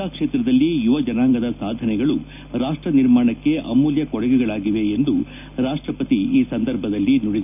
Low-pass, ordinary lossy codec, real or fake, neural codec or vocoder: 3.6 kHz; none; real; none